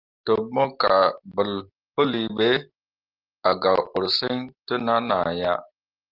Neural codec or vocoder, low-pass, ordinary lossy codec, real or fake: none; 5.4 kHz; Opus, 32 kbps; real